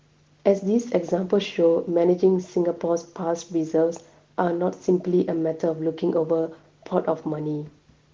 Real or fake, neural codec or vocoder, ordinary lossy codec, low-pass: real; none; Opus, 16 kbps; 7.2 kHz